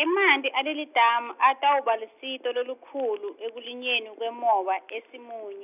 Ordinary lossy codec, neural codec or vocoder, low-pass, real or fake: none; none; 3.6 kHz; real